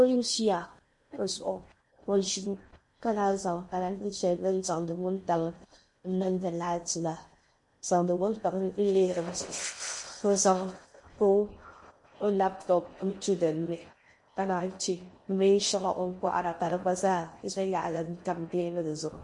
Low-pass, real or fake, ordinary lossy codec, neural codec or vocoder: 10.8 kHz; fake; MP3, 48 kbps; codec, 16 kHz in and 24 kHz out, 0.6 kbps, FocalCodec, streaming, 4096 codes